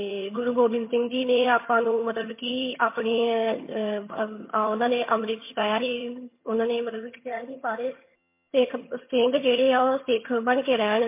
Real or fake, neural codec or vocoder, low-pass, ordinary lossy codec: fake; vocoder, 22.05 kHz, 80 mel bands, HiFi-GAN; 3.6 kHz; MP3, 24 kbps